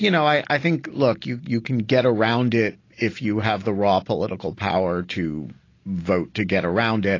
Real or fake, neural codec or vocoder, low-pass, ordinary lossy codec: real; none; 7.2 kHz; AAC, 32 kbps